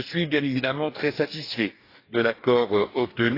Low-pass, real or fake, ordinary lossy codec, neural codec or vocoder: 5.4 kHz; fake; AAC, 32 kbps; codec, 44.1 kHz, 2.6 kbps, DAC